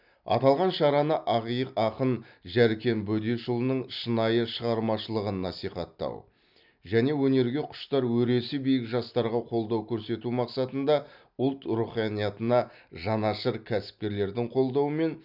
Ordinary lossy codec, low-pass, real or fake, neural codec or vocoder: none; 5.4 kHz; real; none